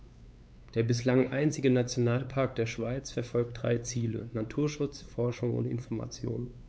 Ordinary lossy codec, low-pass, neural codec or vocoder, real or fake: none; none; codec, 16 kHz, 4 kbps, X-Codec, WavLM features, trained on Multilingual LibriSpeech; fake